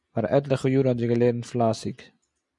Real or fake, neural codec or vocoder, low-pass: real; none; 10.8 kHz